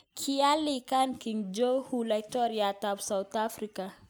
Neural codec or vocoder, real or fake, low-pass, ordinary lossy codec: none; real; none; none